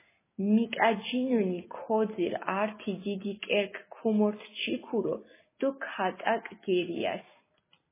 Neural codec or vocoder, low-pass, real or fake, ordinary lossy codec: none; 3.6 kHz; real; MP3, 16 kbps